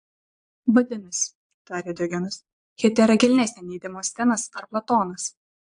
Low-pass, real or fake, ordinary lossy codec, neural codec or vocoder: 9.9 kHz; fake; AAC, 64 kbps; vocoder, 22.05 kHz, 80 mel bands, Vocos